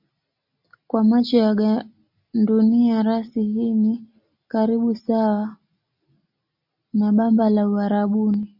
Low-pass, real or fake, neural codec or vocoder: 5.4 kHz; real; none